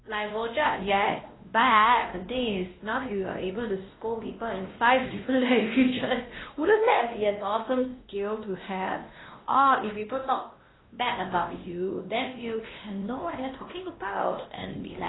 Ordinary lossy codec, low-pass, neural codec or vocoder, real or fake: AAC, 16 kbps; 7.2 kHz; codec, 16 kHz, 1 kbps, X-Codec, WavLM features, trained on Multilingual LibriSpeech; fake